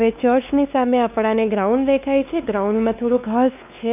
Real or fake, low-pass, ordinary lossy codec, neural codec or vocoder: fake; 3.6 kHz; none; codec, 16 kHz, 2 kbps, X-Codec, WavLM features, trained on Multilingual LibriSpeech